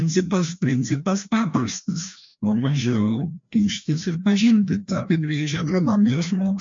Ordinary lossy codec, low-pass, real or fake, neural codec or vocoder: MP3, 48 kbps; 7.2 kHz; fake; codec, 16 kHz, 1 kbps, FreqCodec, larger model